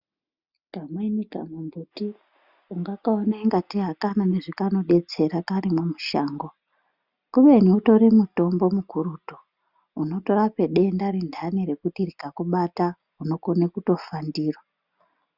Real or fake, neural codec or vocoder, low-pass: real; none; 5.4 kHz